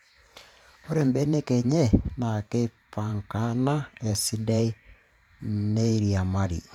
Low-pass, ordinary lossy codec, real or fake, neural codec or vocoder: 19.8 kHz; none; fake; vocoder, 44.1 kHz, 128 mel bands every 512 samples, BigVGAN v2